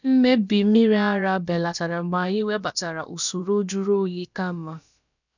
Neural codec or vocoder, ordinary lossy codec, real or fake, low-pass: codec, 16 kHz, about 1 kbps, DyCAST, with the encoder's durations; none; fake; 7.2 kHz